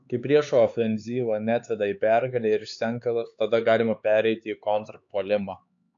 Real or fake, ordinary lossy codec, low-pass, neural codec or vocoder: fake; AAC, 64 kbps; 7.2 kHz; codec, 16 kHz, 4 kbps, X-Codec, HuBERT features, trained on LibriSpeech